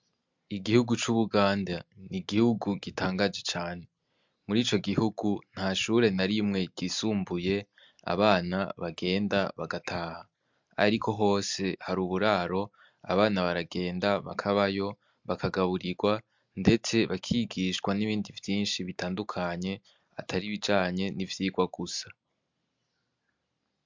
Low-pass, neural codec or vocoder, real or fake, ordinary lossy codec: 7.2 kHz; none; real; MP3, 64 kbps